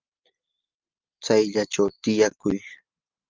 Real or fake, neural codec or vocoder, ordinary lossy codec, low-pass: real; none; Opus, 32 kbps; 7.2 kHz